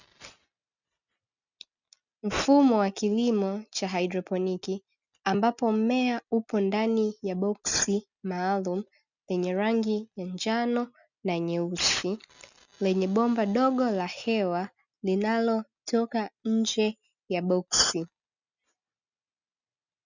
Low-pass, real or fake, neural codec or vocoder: 7.2 kHz; real; none